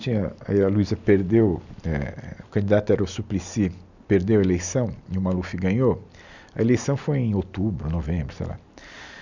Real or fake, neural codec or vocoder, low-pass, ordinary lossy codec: real; none; 7.2 kHz; none